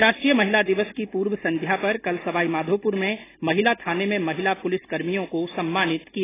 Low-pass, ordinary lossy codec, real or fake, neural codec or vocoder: 3.6 kHz; AAC, 16 kbps; real; none